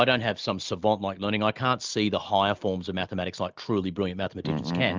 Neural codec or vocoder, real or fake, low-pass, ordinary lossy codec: none; real; 7.2 kHz; Opus, 24 kbps